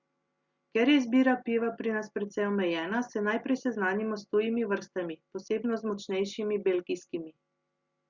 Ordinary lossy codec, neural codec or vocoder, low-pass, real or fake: Opus, 64 kbps; none; 7.2 kHz; real